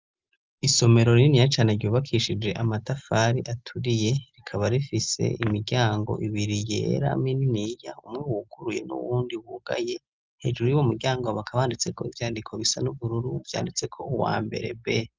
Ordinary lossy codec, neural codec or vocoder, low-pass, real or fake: Opus, 24 kbps; none; 7.2 kHz; real